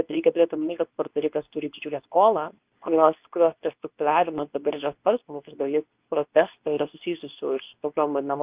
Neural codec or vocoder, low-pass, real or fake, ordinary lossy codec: codec, 24 kHz, 0.9 kbps, WavTokenizer, medium speech release version 2; 3.6 kHz; fake; Opus, 24 kbps